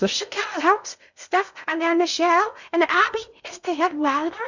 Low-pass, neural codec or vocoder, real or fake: 7.2 kHz; codec, 16 kHz in and 24 kHz out, 0.6 kbps, FocalCodec, streaming, 2048 codes; fake